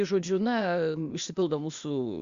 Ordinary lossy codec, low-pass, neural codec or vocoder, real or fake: Opus, 64 kbps; 7.2 kHz; codec, 16 kHz, 0.8 kbps, ZipCodec; fake